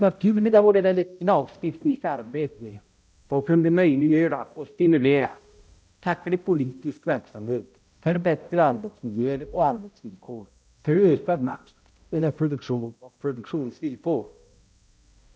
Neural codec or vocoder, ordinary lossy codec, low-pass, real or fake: codec, 16 kHz, 0.5 kbps, X-Codec, HuBERT features, trained on balanced general audio; none; none; fake